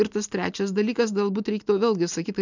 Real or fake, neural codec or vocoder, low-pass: real; none; 7.2 kHz